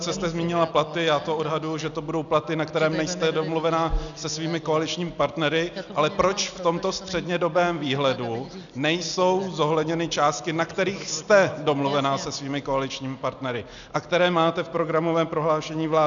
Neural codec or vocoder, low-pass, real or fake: none; 7.2 kHz; real